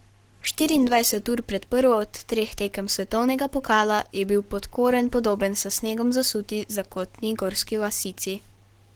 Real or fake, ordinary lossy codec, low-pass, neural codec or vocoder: fake; Opus, 16 kbps; 19.8 kHz; codec, 44.1 kHz, 7.8 kbps, Pupu-Codec